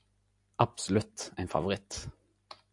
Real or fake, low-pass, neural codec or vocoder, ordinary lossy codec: real; 10.8 kHz; none; AAC, 64 kbps